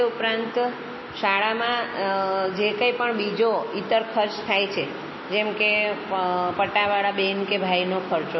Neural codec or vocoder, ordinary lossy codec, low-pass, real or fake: none; MP3, 24 kbps; 7.2 kHz; real